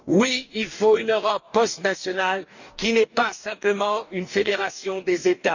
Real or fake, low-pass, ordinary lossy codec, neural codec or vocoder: fake; 7.2 kHz; none; codec, 44.1 kHz, 2.6 kbps, DAC